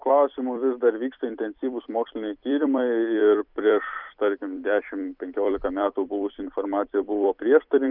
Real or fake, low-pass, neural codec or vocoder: fake; 5.4 kHz; vocoder, 44.1 kHz, 128 mel bands every 256 samples, BigVGAN v2